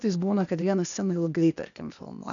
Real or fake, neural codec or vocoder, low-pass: fake; codec, 16 kHz, 0.8 kbps, ZipCodec; 7.2 kHz